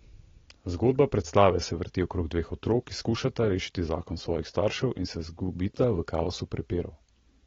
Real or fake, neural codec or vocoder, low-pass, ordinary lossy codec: real; none; 7.2 kHz; AAC, 24 kbps